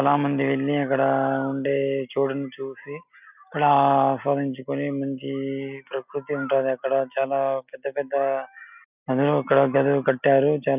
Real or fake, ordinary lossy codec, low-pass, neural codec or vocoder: real; none; 3.6 kHz; none